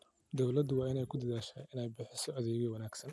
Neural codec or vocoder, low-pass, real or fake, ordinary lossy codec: none; none; real; none